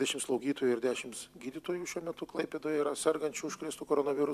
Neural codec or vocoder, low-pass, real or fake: vocoder, 44.1 kHz, 128 mel bands every 512 samples, BigVGAN v2; 14.4 kHz; fake